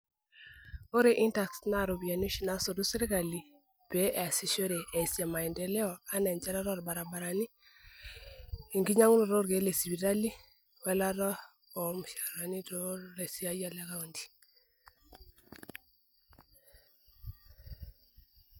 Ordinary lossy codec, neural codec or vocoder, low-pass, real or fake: none; none; none; real